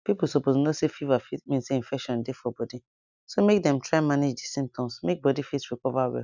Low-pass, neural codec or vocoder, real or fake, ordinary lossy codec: 7.2 kHz; none; real; none